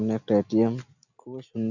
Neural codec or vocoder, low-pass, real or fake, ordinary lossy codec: none; 7.2 kHz; real; none